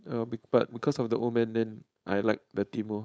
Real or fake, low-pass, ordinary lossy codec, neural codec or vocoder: fake; none; none; codec, 16 kHz, 4.8 kbps, FACodec